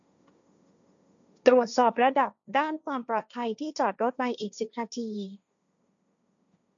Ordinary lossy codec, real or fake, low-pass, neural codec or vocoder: none; fake; 7.2 kHz; codec, 16 kHz, 1.1 kbps, Voila-Tokenizer